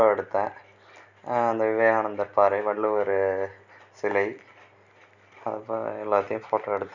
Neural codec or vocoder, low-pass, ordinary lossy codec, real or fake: none; 7.2 kHz; none; real